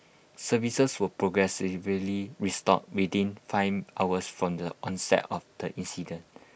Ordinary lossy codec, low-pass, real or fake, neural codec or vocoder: none; none; real; none